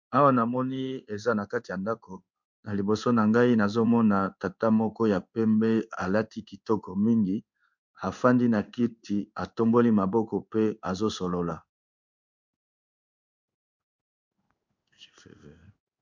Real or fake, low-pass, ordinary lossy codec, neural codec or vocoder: fake; 7.2 kHz; MP3, 64 kbps; codec, 16 kHz in and 24 kHz out, 1 kbps, XY-Tokenizer